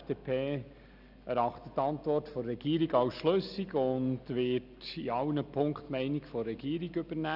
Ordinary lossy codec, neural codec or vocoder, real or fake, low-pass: none; none; real; 5.4 kHz